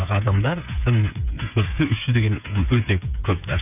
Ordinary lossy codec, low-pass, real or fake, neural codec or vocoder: none; 3.6 kHz; fake; codec, 16 kHz, 8 kbps, FreqCodec, smaller model